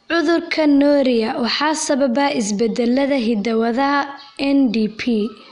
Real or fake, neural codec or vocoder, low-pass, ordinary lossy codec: real; none; 10.8 kHz; none